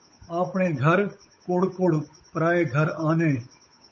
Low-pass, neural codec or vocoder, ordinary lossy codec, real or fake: 7.2 kHz; codec, 16 kHz, 8 kbps, FunCodec, trained on Chinese and English, 25 frames a second; MP3, 32 kbps; fake